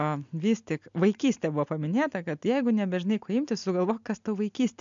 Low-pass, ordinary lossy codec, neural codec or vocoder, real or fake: 7.2 kHz; MP3, 64 kbps; none; real